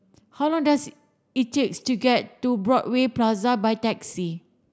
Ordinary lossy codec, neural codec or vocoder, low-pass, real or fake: none; none; none; real